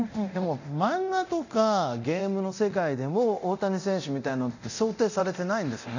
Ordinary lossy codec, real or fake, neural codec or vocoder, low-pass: none; fake; codec, 24 kHz, 0.9 kbps, DualCodec; 7.2 kHz